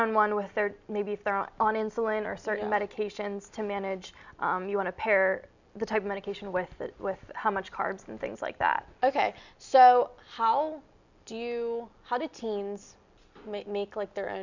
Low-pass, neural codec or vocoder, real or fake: 7.2 kHz; none; real